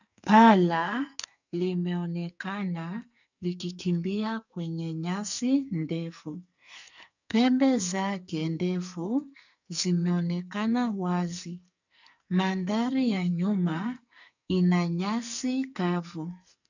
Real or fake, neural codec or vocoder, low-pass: fake; codec, 44.1 kHz, 2.6 kbps, SNAC; 7.2 kHz